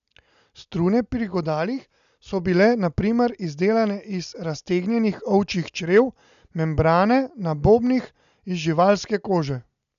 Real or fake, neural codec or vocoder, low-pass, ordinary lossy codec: real; none; 7.2 kHz; none